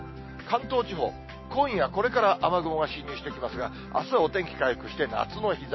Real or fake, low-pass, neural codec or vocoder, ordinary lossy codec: real; 7.2 kHz; none; MP3, 24 kbps